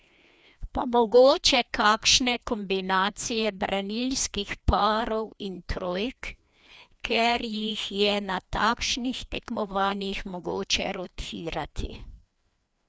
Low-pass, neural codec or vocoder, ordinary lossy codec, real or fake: none; codec, 16 kHz, 2 kbps, FreqCodec, larger model; none; fake